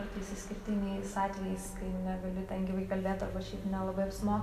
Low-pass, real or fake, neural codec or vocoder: 14.4 kHz; real; none